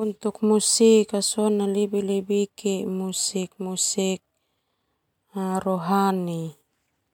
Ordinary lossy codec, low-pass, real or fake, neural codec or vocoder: MP3, 96 kbps; 19.8 kHz; real; none